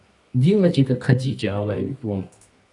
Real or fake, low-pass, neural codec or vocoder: fake; 10.8 kHz; codec, 24 kHz, 0.9 kbps, WavTokenizer, medium music audio release